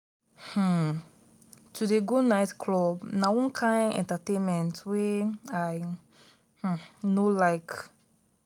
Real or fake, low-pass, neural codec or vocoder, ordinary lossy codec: real; 19.8 kHz; none; none